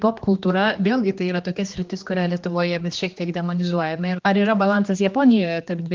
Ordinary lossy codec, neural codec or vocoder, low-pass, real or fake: Opus, 24 kbps; codec, 16 kHz, 2 kbps, X-Codec, HuBERT features, trained on general audio; 7.2 kHz; fake